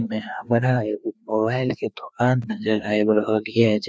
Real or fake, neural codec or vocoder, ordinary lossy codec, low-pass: fake; codec, 16 kHz, 2 kbps, FreqCodec, larger model; none; none